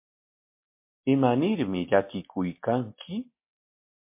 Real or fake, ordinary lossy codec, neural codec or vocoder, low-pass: real; MP3, 24 kbps; none; 3.6 kHz